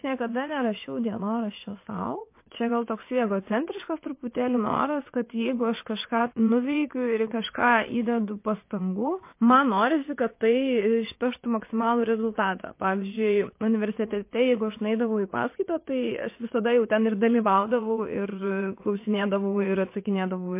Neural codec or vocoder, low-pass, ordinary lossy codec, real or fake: vocoder, 22.05 kHz, 80 mel bands, WaveNeXt; 3.6 kHz; MP3, 24 kbps; fake